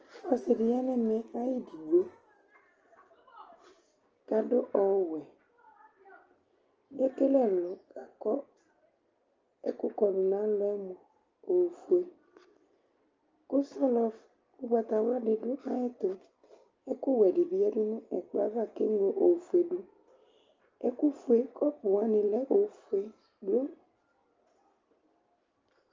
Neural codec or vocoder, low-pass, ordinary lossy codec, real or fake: none; 7.2 kHz; Opus, 24 kbps; real